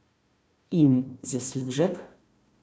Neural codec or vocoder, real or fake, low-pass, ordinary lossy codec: codec, 16 kHz, 1 kbps, FunCodec, trained on Chinese and English, 50 frames a second; fake; none; none